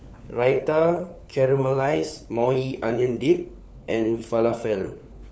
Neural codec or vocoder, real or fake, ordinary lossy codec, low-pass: codec, 16 kHz, 4 kbps, FunCodec, trained on LibriTTS, 50 frames a second; fake; none; none